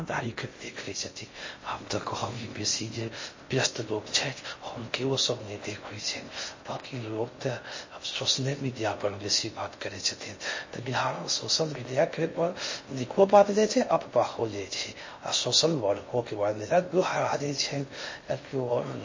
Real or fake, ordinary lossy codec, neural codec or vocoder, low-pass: fake; MP3, 32 kbps; codec, 16 kHz in and 24 kHz out, 0.6 kbps, FocalCodec, streaming, 2048 codes; 7.2 kHz